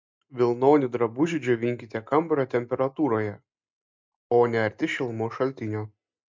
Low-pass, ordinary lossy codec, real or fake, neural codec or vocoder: 7.2 kHz; MP3, 64 kbps; real; none